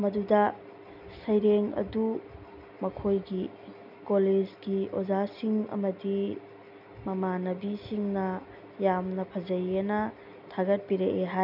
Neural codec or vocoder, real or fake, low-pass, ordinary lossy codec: none; real; 5.4 kHz; none